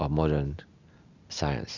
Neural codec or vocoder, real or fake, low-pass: none; real; 7.2 kHz